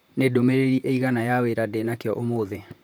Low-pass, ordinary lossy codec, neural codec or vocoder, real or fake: none; none; vocoder, 44.1 kHz, 128 mel bands, Pupu-Vocoder; fake